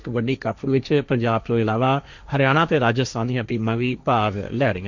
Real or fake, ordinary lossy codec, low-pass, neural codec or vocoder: fake; none; 7.2 kHz; codec, 16 kHz, 1.1 kbps, Voila-Tokenizer